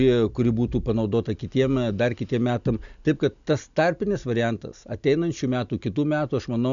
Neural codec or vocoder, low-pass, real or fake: none; 7.2 kHz; real